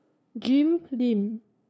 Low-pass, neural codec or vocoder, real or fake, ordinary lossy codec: none; codec, 16 kHz, 2 kbps, FunCodec, trained on LibriTTS, 25 frames a second; fake; none